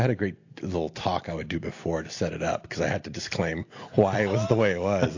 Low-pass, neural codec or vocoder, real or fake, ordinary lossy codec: 7.2 kHz; none; real; AAC, 48 kbps